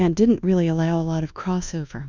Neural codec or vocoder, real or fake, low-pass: codec, 24 kHz, 1.2 kbps, DualCodec; fake; 7.2 kHz